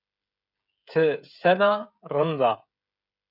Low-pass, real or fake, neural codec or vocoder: 5.4 kHz; fake; codec, 16 kHz, 16 kbps, FreqCodec, smaller model